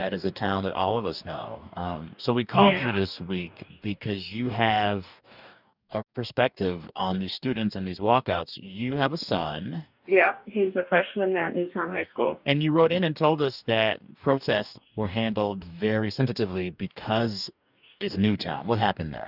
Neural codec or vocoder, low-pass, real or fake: codec, 44.1 kHz, 2.6 kbps, DAC; 5.4 kHz; fake